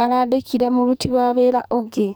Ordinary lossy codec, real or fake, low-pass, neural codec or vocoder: none; fake; none; codec, 44.1 kHz, 2.6 kbps, SNAC